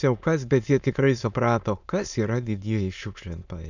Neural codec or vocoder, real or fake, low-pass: autoencoder, 22.05 kHz, a latent of 192 numbers a frame, VITS, trained on many speakers; fake; 7.2 kHz